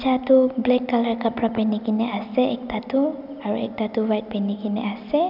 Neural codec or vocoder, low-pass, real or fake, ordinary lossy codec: none; 5.4 kHz; real; none